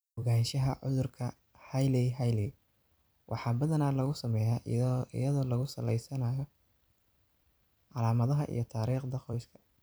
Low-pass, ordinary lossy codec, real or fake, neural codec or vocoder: none; none; fake; vocoder, 44.1 kHz, 128 mel bands every 256 samples, BigVGAN v2